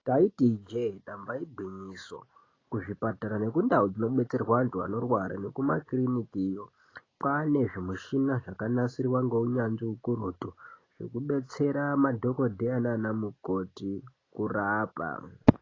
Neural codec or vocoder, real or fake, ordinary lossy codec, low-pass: none; real; AAC, 32 kbps; 7.2 kHz